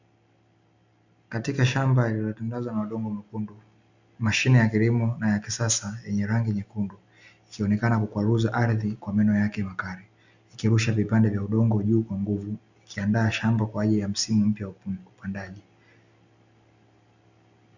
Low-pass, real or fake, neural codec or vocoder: 7.2 kHz; real; none